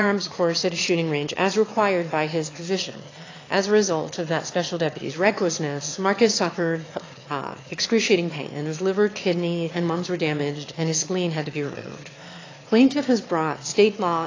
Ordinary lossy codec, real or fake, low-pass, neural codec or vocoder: AAC, 32 kbps; fake; 7.2 kHz; autoencoder, 22.05 kHz, a latent of 192 numbers a frame, VITS, trained on one speaker